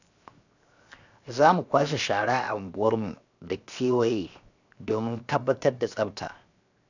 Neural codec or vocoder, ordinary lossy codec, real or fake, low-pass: codec, 16 kHz, 0.7 kbps, FocalCodec; none; fake; 7.2 kHz